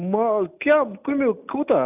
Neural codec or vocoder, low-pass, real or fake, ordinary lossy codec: none; 3.6 kHz; real; none